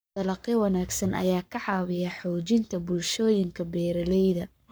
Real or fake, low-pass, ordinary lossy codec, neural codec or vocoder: fake; none; none; codec, 44.1 kHz, 7.8 kbps, Pupu-Codec